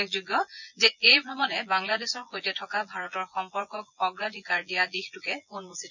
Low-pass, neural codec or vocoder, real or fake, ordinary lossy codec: 7.2 kHz; vocoder, 24 kHz, 100 mel bands, Vocos; fake; none